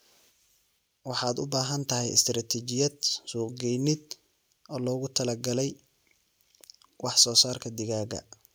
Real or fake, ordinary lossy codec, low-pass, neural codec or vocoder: real; none; none; none